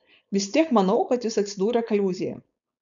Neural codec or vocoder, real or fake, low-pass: codec, 16 kHz, 4.8 kbps, FACodec; fake; 7.2 kHz